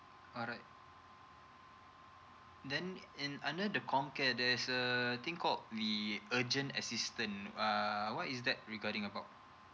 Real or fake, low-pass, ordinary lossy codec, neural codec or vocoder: real; none; none; none